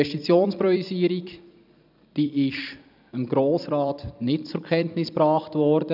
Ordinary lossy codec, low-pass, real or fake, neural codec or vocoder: none; 5.4 kHz; fake; vocoder, 44.1 kHz, 128 mel bands every 256 samples, BigVGAN v2